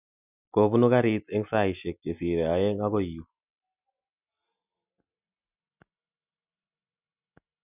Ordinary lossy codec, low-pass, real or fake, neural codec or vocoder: none; 3.6 kHz; real; none